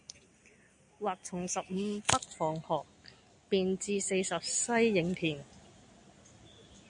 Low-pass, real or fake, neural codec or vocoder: 9.9 kHz; real; none